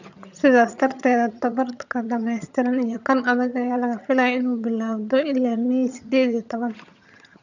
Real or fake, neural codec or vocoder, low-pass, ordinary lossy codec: fake; vocoder, 22.05 kHz, 80 mel bands, HiFi-GAN; 7.2 kHz; none